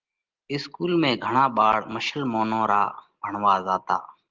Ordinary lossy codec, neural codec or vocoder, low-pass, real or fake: Opus, 16 kbps; none; 7.2 kHz; real